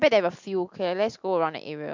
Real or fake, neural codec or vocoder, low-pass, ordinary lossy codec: real; none; 7.2 kHz; MP3, 64 kbps